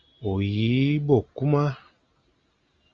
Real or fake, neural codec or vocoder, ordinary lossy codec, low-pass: real; none; Opus, 32 kbps; 7.2 kHz